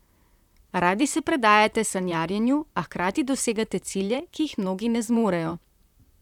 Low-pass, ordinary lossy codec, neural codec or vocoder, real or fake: 19.8 kHz; none; vocoder, 44.1 kHz, 128 mel bands, Pupu-Vocoder; fake